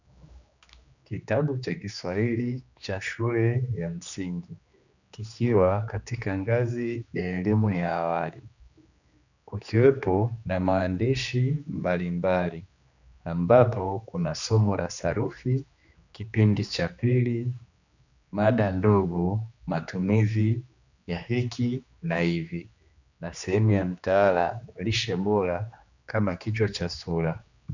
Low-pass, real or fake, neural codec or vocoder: 7.2 kHz; fake; codec, 16 kHz, 2 kbps, X-Codec, HuBERT features, trained on general audio